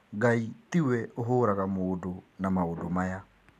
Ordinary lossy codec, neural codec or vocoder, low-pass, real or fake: none; none; 14.4 kHz; real